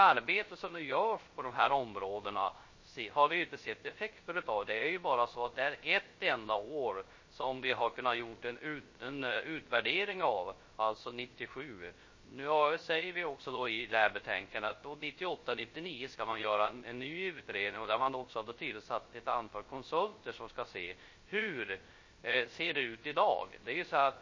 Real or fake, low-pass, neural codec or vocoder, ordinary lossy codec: fake; 7.2 kHz; codec, 16 kHz, 0.3 kbps, FocalCodec; MP3, 32 kbps